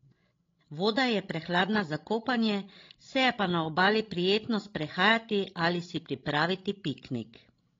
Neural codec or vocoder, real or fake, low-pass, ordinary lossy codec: codec, 16 kHz, 16 kbps, FreqCodec, larger model; fake; 7.2 kHz; AAC, 32 kbps